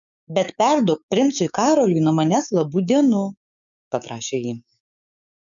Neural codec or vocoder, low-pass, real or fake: codec, 16 kHz, 6 kbps, DAC; 7.2 kHz; fake